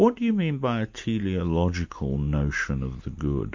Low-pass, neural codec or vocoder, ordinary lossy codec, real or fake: 7.2 kHz; none; MP3, 48 kbps; real